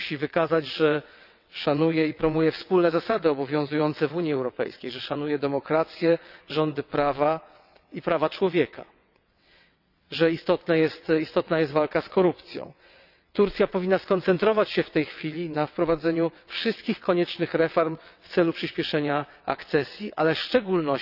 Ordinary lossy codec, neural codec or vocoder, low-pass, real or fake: none; vocoder, 22.05 kHz, 80 mel bands, WaveNeXt; 5.4 kHz; fake